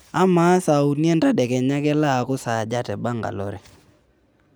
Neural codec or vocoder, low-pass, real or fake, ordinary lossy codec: none; none; real; none